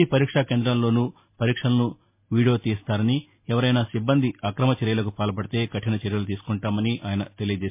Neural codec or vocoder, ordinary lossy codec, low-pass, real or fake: none; MP3, 24 kbps; 3.6 kHz; real